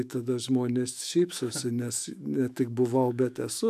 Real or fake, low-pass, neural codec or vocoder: fake; 14.4 kHz; autoencoder, 48 kHz, 128 numbers a frame, DAC-VAE, trained on Japanese speech